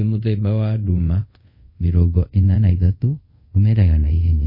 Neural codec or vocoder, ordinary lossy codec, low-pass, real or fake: codec, 24 kHz, 0.5 kbps, DualCodec; MP3, 24 kbps; 5.4 kHz; fake